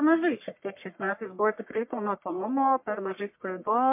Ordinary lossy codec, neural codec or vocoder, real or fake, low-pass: MP3, 32 kbps; codec, 44.1 kHz, 1.7 kbps, Pupu-Codec; fake; 3.6 kHz